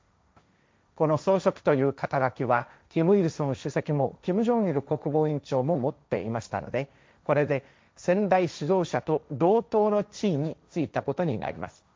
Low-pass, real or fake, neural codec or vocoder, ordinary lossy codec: none; fake; codec, 16 kHz, 1.1 kbps, Voila-Tokenizer; none